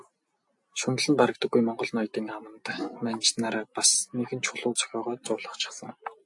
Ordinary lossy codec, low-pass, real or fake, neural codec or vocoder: AAC, 64 kbps; 10.8 kHz; real; none